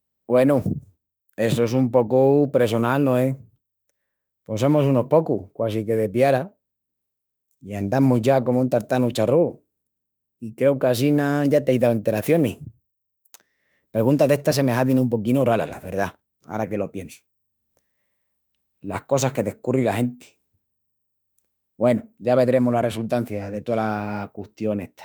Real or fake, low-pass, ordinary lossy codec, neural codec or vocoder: fake; none; none; autoencoder, 48 kHz, 32 numbers a frame, DAC-VAE, trained on Japanese speech